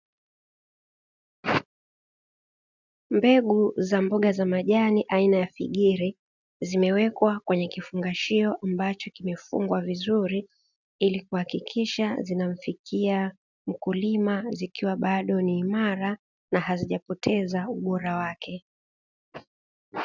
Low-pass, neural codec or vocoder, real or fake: 7.2 kHz; none; real